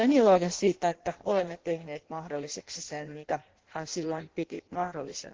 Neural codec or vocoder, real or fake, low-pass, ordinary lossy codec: codec, 16 kHz in and 24 kHz out, 0.6 kbps, FireRedTTS-2 codec; fake; 7.2 kHz; Opus, 16 kbps